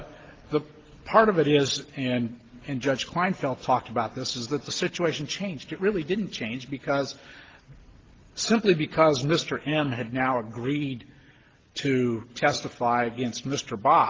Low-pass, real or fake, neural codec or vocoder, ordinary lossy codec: 7.2 kHz; real; none; Opus, 16 kbps